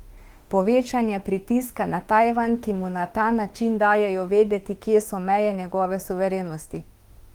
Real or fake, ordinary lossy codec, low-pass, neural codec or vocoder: fake; Opus, 24 kbps; 19.8 kHz; autoencoder, 48 kHz, 32 numbers a frame, DAC-VAE, trained on Japanese speech